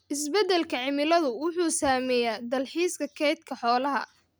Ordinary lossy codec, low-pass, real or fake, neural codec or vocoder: none; none; real; none